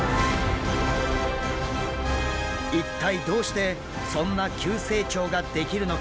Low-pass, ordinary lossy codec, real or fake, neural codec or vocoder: none; none; real; none